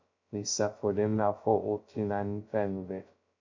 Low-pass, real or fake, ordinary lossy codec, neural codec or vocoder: 7.2 kHz; fake; MP3, 64 kbps; codec, 16 kHz, 0.2 kbps, FocalCodec